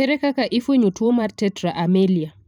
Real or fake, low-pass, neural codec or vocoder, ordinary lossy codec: fake; 19.8 kHz; vocoder, 44.1 kHz, 128 mel bands every 512 samples, BigVGAN v2; none